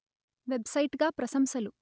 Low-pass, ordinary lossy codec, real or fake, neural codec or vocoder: none; none; real; none